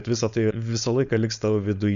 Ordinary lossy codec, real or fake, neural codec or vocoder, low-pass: MP3, 96 kbps; fake; codec, 16 kHz, 4.8 kbps, FACodec; 7.2 kHz